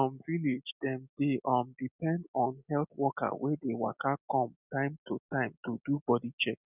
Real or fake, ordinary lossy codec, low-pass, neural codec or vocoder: real; none; 3.6 kHz; none